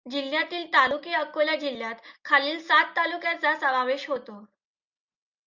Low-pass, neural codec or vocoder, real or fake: 7.2 kHz; none; real